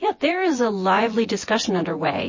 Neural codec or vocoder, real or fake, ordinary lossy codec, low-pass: vocoder, 24 kHz, 100 mel bands, Vocos; fake; MP3, 32 kbps; 7.2 kHz